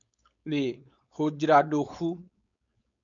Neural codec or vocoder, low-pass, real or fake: codec, 16 kHz, 4.8 kbps, FACodec; 7.2 kHz; fake